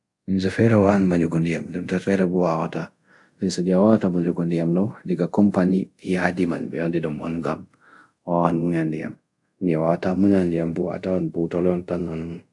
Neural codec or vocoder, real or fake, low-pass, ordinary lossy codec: codec, 24 kHz, 0.5 kbps, DualCodec; fake; 10.8 kHz; AAC, 64 kbps